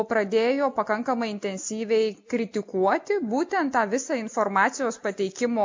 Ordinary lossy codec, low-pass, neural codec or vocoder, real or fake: MP3, 48 kbps; 7.2 kHz; none; real